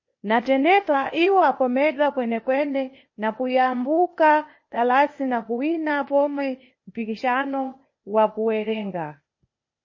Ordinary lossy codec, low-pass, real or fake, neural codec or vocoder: MP3, 32 kbps; 7.2 kHz; fake; codec, 16 kHz, 0.8 kbps, ZipCodec